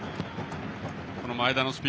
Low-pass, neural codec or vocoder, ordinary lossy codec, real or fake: none; none; none; real